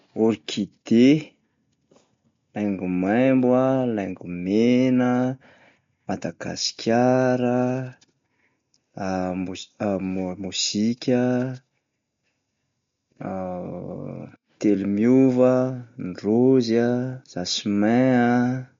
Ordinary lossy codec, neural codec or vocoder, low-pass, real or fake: MP3, 48 kbps; none; 7.2 kHz; real